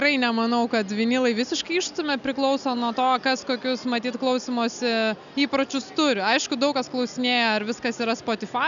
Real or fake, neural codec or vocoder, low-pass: real; none; 7.2 kHz